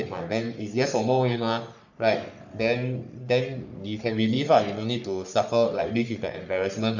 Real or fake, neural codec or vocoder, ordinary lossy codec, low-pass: fake; codec, 44.1 kHz, 3.4 kbps, Pupu-Codec; none; 7.2 kHz